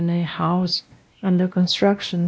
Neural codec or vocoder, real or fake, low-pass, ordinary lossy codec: codec, 16 kHz, 1 kbps, X-Codec, WavLM features, trained on Multilingual LibriSpeech; fake; none; none